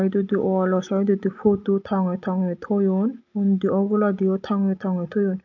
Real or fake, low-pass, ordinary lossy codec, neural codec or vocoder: real; 7.2 kHz; AAC, 48 kbps; none